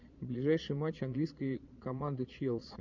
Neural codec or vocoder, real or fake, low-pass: vocoder, 22.05 kHz, 80 mel bands, Vocos; fake; 7.2 kHz